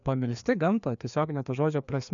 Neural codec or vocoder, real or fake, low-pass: codec, 16 kHz, 2 kbps, FreqCodec, larger model; fake; 7.2 kHz